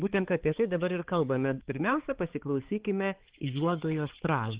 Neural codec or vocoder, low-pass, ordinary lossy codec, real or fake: codec, 16 kHz, 4 kbps, X-Codec, HuBERT features, trained on balanced general audio; 3.6 kHz; Opus, 16 kbps; fake